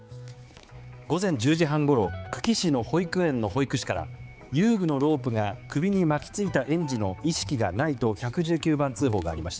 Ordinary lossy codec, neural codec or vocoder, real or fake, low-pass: none; codec, 16 kHz, 4 kbps, X-Codec, HuBERT features, trained on balanced general audio; fake; none